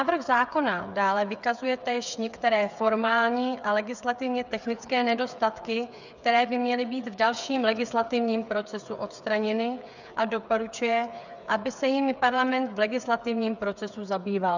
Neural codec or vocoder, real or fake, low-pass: codec, 16 kHz, 8 kbps, FreqCodec, smaller model; fake; 7.2 kHz